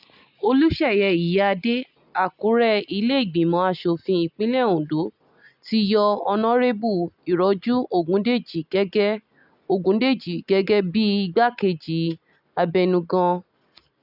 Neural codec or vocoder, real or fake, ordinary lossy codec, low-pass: none; real; none; 5.4 kHz